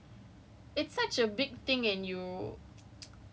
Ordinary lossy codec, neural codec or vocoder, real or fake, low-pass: none; none; real; none